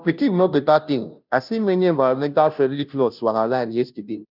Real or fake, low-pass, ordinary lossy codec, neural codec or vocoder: fake; 5.4 kHz; none; codec, 16 kHz, 0.5 kbps, FunCodec, trained on Chinese and English, 25 frames a second